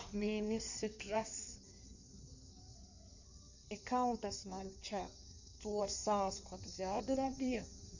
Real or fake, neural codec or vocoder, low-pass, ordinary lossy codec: fake; codec, 16 kHz in and 24 kHz out, 1.1 kbps, FireRedTTS-2 codec; 7.2 kHz; none